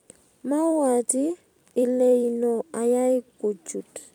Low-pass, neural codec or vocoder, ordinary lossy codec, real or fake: 19.8 kHz; vocoder, 44.1 kHz, 128 mel bands every 256 samples, BigVGAN v2; none; fake